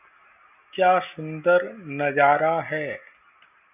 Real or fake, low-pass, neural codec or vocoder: real; 3.6 kHz; none